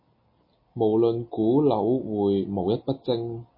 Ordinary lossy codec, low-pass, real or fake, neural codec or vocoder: MP3, 24 kbps; 5.4 kHz; real; none